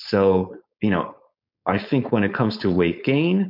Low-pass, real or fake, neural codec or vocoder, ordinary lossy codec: 5.4 kHz; fake; codec, 16 kHz, 4.8 kbps, FACodec; MP3, 48 kbps